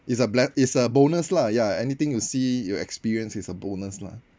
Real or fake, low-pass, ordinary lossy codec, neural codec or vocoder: real; none; none; none